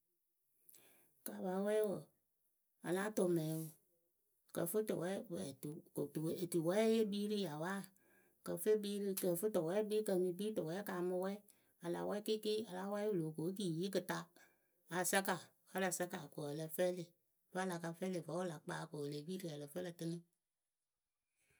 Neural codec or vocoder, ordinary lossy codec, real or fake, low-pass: none; none; real; none